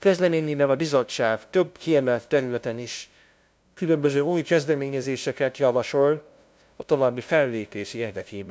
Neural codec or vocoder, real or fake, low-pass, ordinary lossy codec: codec, 16 kHz, 0.5 kbps, FunCodec, trained on LibriTTS, 25 frames a second; fake; none; none